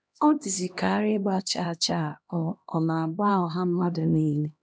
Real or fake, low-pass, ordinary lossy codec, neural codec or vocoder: fake; none; none; codec, 16 kHz, 1 kbps, X-Codec, HuBERT features, trained on LibriSpeech